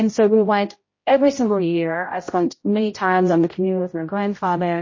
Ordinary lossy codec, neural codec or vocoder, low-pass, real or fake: MP3, 32 kbps; codec, 16 kHz, 0.5 kbps, X-Codec, HuBERT features, trained on general audio; 7.2 kHz; fake